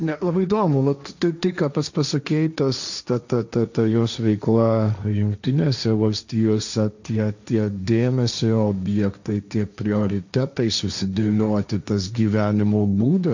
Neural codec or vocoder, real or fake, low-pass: codec, 16 kHz, 1.1 kbps, Voila-Tokenizer; fake; 7.2 kHz